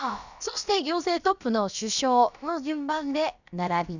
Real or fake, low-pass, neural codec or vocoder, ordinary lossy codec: fake; 7.2 kHz; codec, 16 kHz, about 1 kbps, DyCAST, with the encoder's durations; none